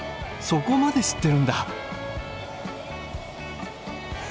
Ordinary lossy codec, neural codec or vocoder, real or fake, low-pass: none; none; real; none